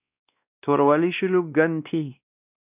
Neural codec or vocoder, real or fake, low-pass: codec, 16 kHz, 1 kbps, X-Codec, WavLM features, trained on Multilingual LibriSpeech; fake; 3.6 kHz